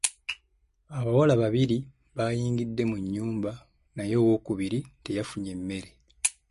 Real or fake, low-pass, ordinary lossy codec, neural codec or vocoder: real; 14.4 kHz; MP3, 48 kbps; none